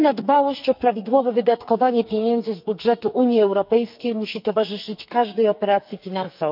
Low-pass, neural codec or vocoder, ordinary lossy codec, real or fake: 5.4 kHz; codec, 44.1 kHz, 2.6 kbps, SNAC; none; fake